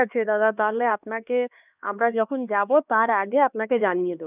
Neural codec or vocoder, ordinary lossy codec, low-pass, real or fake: codec, 16 kHz, 2 kbps, X-Codec, HuBERT features, trained on LibriSpeech; none; 3.6 kHz; fake